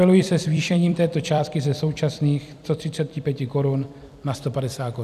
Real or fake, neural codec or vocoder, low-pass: fake; vocoder, 44.1 kHz, 128 mel bands every 256 samples, BigVGAN v2; 14.4 kHz